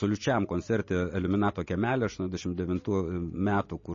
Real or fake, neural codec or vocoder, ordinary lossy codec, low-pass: real; none; MP3, 32 kbps; 7.2 kHz